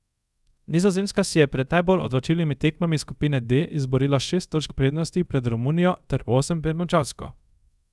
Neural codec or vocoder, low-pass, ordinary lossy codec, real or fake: codec, 24 kHz, 0.5 kbps, DualCodec; none; none; fake